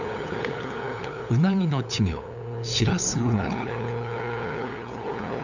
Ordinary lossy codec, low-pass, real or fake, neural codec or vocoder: none; 7.2 kHz; fake; codec, 16 kHz, 8 kbps, FunCodec, trained on LibriTTS, 25 frames a second